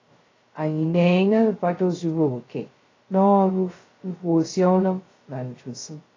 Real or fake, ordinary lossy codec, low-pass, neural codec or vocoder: fake; AAC, 32 kbps; 7.2 kHz; codec, 16 kHz, 0.2 kbps, FocalCodec